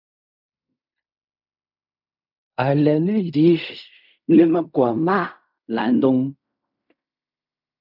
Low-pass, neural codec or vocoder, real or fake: 5.4 kHz; codec, 16 kHz in and 24 kHz out, 0.4 kbps, LongCat-Audio-Codec, fine tuned four codebook decoder; fake